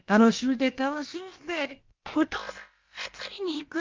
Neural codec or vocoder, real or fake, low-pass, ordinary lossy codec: codec, 16 kHz, about 1 kbps, DyCAST, with the encoder's durations; fake; 7.2 kHz; Opus, 32 kbps